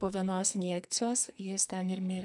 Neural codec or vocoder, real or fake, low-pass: codec, 44.1 kHz, 2.6 kbps, SNAC; fake; 10.8 kHz